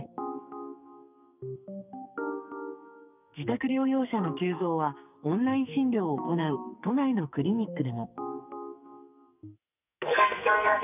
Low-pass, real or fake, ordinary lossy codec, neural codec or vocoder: 3.6 kHz; fake; none; codec, 44.1 kHz, 2.6 kbps, SNAC